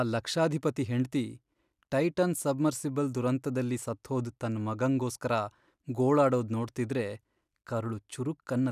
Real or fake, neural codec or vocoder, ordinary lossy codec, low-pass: real; none; none; 14.4 kHz